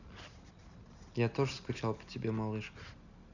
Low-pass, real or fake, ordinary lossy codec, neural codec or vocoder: 7.2 kHz; real; none; none